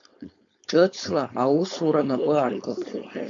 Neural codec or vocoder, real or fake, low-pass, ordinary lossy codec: codec, 16 kHz, 4.8 kbps, FACodec; fake; 7.2 kHz; MP3, 64 kbps